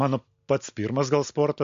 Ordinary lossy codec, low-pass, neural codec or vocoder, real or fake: MP3, 48 kbps; 7.2 kHz; none; real